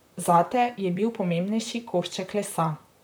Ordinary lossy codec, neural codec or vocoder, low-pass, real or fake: none; vocoder, 44.1 kHz, 128 mel bands, Pupu-Vocoder; none; fake